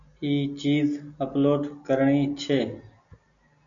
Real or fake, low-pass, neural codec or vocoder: real; 7.2 kHz; none